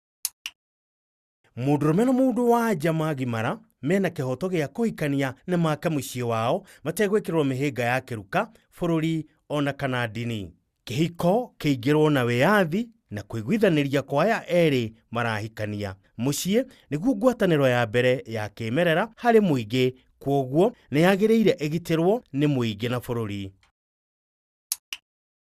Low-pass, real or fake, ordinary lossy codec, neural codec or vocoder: 14.4 kHz; real; Opus, 64 kbps; none